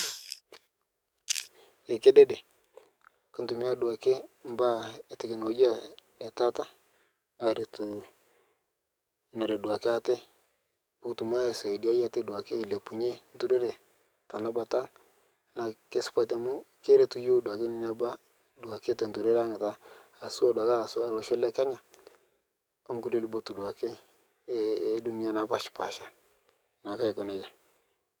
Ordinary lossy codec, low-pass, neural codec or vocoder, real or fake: none; none; codec, 44.1 kHz, 7.8 kbps, DAC; fake